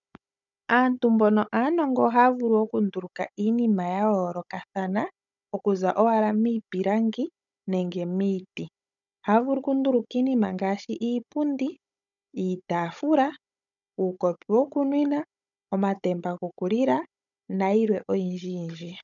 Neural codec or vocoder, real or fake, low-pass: codec, 16 kHz, 16 kbps, FunCodec, trained on Chinese and English, 50 frames a second; fake; 7.2 kHz